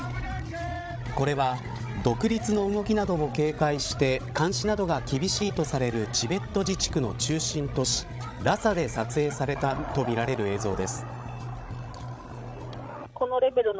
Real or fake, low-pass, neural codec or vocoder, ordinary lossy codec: fake; none; codec, 16 kHz, 16 kbps, FreqCodec, larger model; none